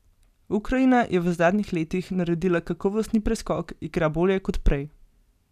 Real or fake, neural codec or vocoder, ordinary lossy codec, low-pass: real; none; none; 14.4 kHz